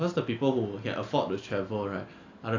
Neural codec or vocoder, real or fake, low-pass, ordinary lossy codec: none; real; 7.2 kHz; AAC, 48 kbps